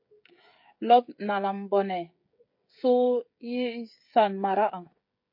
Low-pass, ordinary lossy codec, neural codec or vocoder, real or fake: 5.4 kHz; MP3, 32 kbps; codec, 16 kHz, 16 kbps, FreqCodec, smaller model; fake